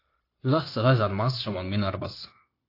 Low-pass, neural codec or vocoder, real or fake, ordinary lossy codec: 5.4 kHz; codec, 16 kHz, 0.9 kbps, LongCat-Audio-Codec; fake; AAC, 32 kbps